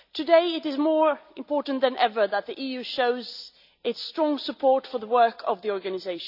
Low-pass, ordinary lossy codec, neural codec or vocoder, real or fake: 5.4 kHz; none; none; real